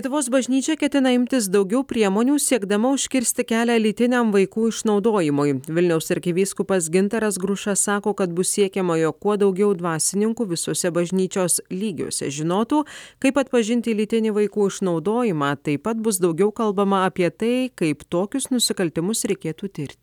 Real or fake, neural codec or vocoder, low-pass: real; none; 19.8 kHz